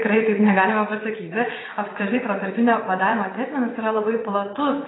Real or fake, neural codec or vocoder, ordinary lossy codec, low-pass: fake; vocoder, 22.05 kHz, 80 mel bands, WaveNeXt; AAC, 16 kbps; 7.2 kHz